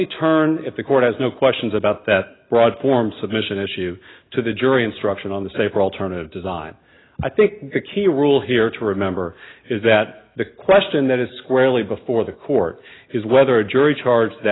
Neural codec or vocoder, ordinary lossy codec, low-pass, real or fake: none; AAC, 16 kbps; 7.2 kHz; real